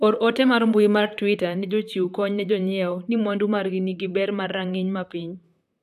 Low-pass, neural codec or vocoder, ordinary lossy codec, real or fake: 14.4 kHz; vocoder, 44.1 kHz, 128 mel bands, Pupu-Vocoder; none; fake